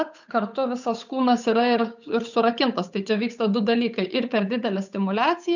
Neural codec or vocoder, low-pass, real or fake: codec, 16 kHz, 8 kbps, FunCodec, trained on Chinese and English, 25 frames a second; 7.2 kHz; fake